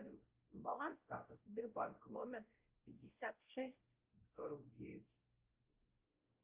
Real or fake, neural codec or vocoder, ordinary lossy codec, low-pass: fake; codec, 16 kHz, 0.5 kbps, X-Codec, WavLM features, trained on Multilingual LibriSpeech; Opus, 16 kbps; 3.6 kHz